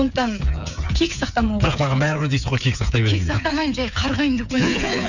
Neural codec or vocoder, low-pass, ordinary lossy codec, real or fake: codec, 16 kHz, 8 kbps, FreqCodec, smaller model; 7.2 kHz; none; fake